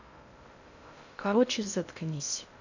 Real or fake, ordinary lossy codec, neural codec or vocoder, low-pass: fake; none; codec, 16 kHz in and 24 kHz out, 0.6 kbps, FocalCodec, streaming, 2048 codes; 7.2 kHz